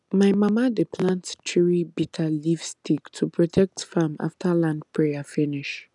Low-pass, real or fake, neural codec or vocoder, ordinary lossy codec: 10.8 kHz; real; none; none